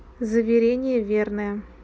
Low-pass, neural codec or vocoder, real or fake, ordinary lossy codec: none; none; real; none